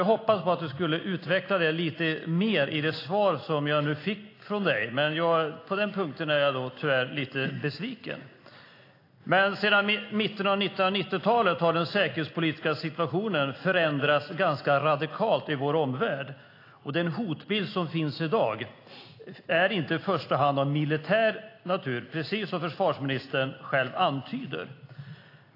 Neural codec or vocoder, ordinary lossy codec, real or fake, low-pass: none; AAC, 32 kbps; real; 5.4 kHz